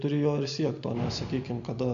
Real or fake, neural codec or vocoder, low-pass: real; none; 7.2 kHz